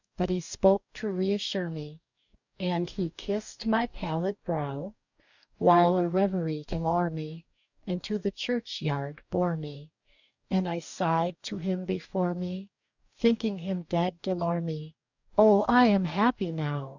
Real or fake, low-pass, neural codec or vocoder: fake; 7.2 kHz; codec, 44.1 kHz, 2.6 kbps, DAC